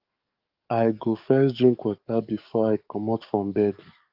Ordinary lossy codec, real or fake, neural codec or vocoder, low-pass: Opus, 24 kbps; fake; codec, 44.1 kHz, 7.8 kbps, DAC; 5.4 kHz